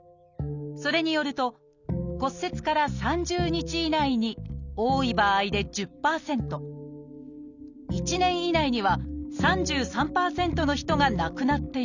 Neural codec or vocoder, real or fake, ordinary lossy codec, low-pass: none; real; none; 7.2 kHz